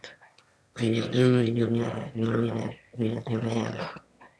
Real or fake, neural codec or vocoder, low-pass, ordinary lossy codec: fake; autoencoder, 22.05 kHz, a latent of 192 numbers a frame, VITS, trained on one speaker; none; none